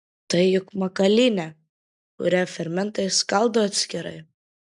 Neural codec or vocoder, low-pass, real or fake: none; 10.8 kHz; real